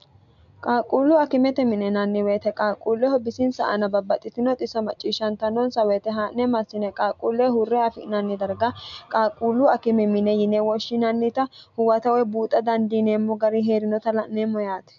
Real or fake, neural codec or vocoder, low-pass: real; none; 7.2 kHz